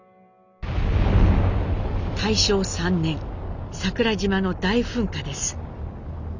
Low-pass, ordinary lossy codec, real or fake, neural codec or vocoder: 7.2 kHz; none; real; none